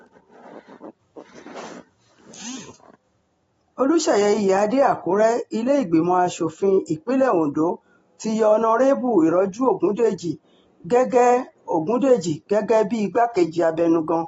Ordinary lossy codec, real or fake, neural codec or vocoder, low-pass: AAC, 24 kbps; real; none; 19.8 kHz